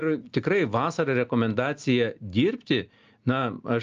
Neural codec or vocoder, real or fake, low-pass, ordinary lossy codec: none; real; 7.2 kHz; Opus, 24 kbps